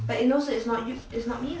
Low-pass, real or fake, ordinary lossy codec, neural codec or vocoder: none; real; none; none